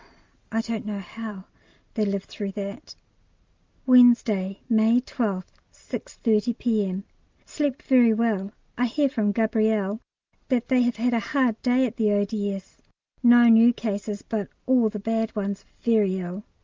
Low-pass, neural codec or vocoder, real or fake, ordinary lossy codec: 7.2 kHz; none; real; Opus, 32 kbps